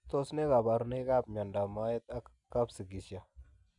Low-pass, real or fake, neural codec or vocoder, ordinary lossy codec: 10.8 kHz; real; none; none